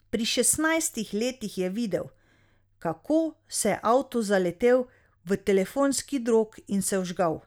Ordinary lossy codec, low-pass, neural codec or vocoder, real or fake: none; none; none; real